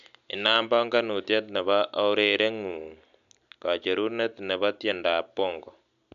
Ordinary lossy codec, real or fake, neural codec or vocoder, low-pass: none; real; none; 7.2 kHz